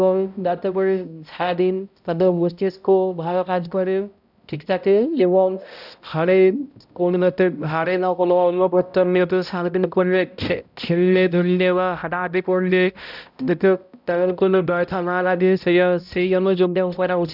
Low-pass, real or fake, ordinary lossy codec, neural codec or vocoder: 5.4 kHz; fake; none; codec, 16 kHz, 0.5 kbps, X-Codec, HuBERT features, trained on balanced general audio